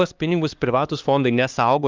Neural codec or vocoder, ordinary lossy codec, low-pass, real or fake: codec, 16 kHz, 2 kbps, X-Codec, HuBERT features, trained on LibriSpeech; Opus, 24 kbps; 7.2 kHz; fake